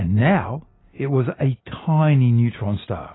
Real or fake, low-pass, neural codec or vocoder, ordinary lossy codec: real; 7.2 kHz; none; AAC, 16 kbps